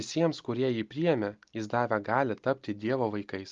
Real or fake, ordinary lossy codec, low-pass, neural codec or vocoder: real; Opus, 32 kbps; 7.2 kHz; none